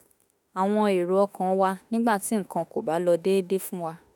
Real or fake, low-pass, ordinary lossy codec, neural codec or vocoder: fake; 19.8 kHz; none; autoencoder, 48 kHz, 32 numbers a frame, DAC-VAE, trained on Japanese speech